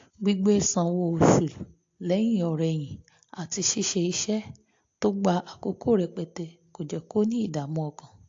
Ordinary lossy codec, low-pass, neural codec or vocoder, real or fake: AAC, 48 kbps; 7.2 kHz; none; real